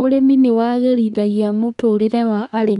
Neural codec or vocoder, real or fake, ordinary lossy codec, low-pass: codec, 24 kHz, 1 kbps, SNAC; fake; none; 10.8 kHz